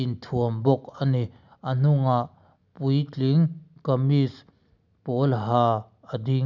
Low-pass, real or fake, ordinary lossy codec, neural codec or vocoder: 7.2 kHz; real; none; none